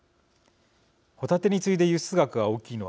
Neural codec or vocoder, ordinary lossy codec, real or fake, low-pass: none; none; real; none